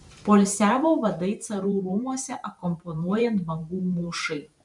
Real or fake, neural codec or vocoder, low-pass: fake; vocoder, 44.1 kHz, 128 mel bands every 512 samples, BigVGAN v2; 10.8 kHz